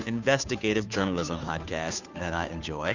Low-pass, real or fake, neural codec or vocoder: 7.2 kHz; fake; codec, 16 kHz, 2 kbps, FunCodec, trained on Chinese and English, 25 frames a second